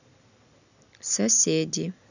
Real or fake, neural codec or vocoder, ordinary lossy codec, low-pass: real; none; none; 7.2 kHz